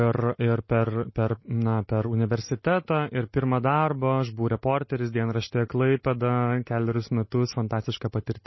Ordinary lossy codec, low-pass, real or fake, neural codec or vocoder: MP3, 24 kbps; 7.2 kHz; real; none